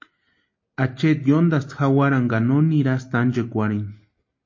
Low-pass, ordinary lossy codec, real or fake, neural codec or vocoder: 7.2 kHz; MP3, 32 kbps; real; none